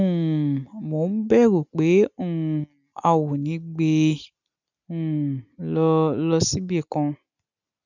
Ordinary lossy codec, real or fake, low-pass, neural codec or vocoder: none; real; 7.2 kHz; none